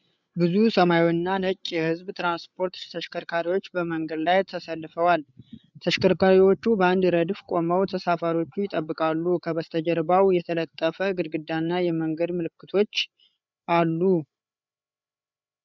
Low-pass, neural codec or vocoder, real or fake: 7.2 kHz; codec, 16 kHz, 8 kbps, FreqCodec, larger model; fake